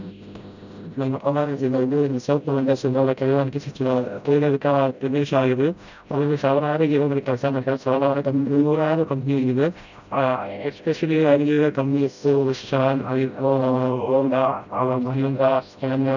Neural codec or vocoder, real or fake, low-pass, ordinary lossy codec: codec, 16 kHz, 0.5 kbps, FreqCodec, smaller model; fake; 7.2 kHz; none